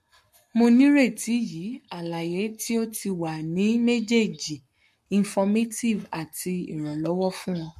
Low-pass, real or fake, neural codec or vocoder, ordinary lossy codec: 14.4 kHz; fake; codec, 44.1 kHz, 7.8 kbps, Pupu-Codec; MP3, 64 kbps